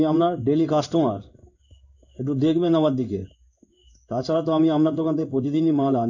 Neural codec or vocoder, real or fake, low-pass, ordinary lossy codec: codec, 16 kHz in and 24 kHz out, 1 kbps, XY-Tokenizer; fake; 7.2 kHz; none